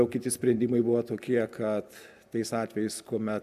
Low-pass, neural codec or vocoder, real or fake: 14.4 kHz; none; real